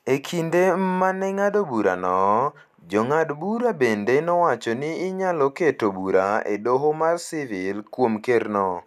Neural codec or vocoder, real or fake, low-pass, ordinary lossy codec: none; real; 14.4 kHz; none